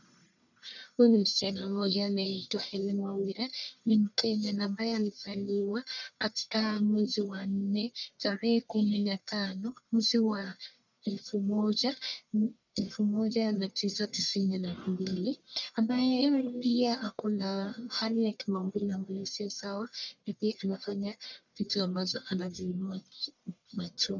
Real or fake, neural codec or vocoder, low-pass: fake; codec, 44.1 kHz, 1.7 kbps, Pupu-Codec; 7.2 kHz